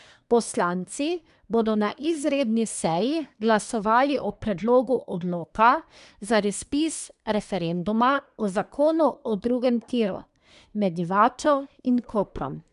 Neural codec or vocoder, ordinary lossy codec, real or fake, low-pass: codec, 24 kHz, 1 kbps, SNAC; none; fake; 10.8 kHz